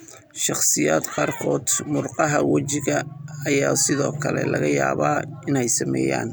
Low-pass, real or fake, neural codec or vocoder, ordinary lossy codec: none; real; none; none